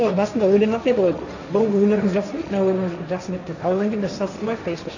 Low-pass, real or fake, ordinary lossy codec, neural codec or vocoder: 7.2 kHz; fake; none; codec, 16 kHz, 1.1 kbps, Voila-Tokenizer